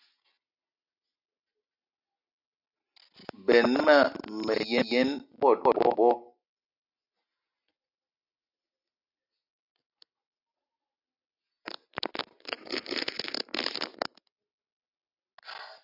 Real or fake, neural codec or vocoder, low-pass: real; none; 5.4 kHz